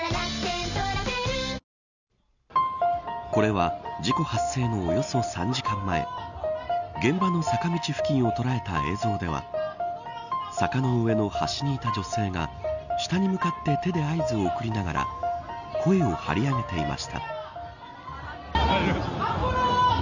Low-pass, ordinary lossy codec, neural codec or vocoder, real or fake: 7.2 kHz; none; none; real